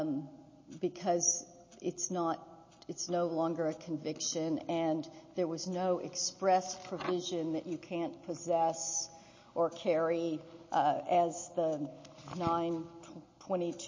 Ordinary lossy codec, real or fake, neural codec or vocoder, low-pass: MP3, 32 kbps; real; none; 7.2 kHz